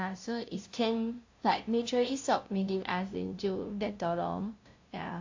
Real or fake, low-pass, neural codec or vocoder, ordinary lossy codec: fake; 7.2 kHz; codec, 16 kHz, 0.5 kbps, FunCodec, trained on LibriTTS, 25 frames a second; AAC, 48 kbps